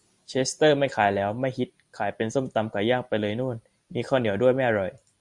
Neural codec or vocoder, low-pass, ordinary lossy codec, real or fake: none; 10.8 kHz; Opus, 64 kbps; real